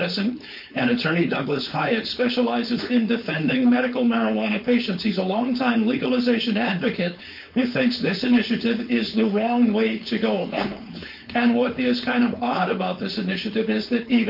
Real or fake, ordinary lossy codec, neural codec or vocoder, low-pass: fake; MP3, 32 kbps; codec, 16 kHz, 4.8 kbps, FACodec; 5.4 kHz